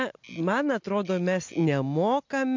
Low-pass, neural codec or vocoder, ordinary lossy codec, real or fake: 7.2 kHz; none; MP3, 48 kbps; real